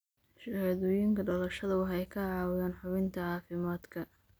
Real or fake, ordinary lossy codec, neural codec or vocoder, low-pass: real; none; none; none